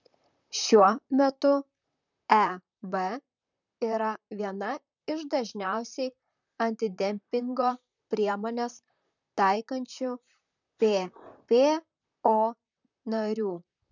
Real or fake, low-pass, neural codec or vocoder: fake; 7.2 kHz; vocoder, 44.1 kHz, 128 mel bands, Pupu-Vocoder